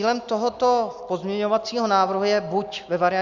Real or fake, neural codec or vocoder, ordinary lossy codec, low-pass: real; none; Opus, 64 kbps; 7.2 kHz